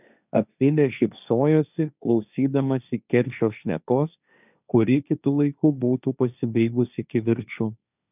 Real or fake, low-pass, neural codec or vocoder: fake; 3.6 kHz; codec, 16 kHz, 1.1 kbps, Voila-Tokenizer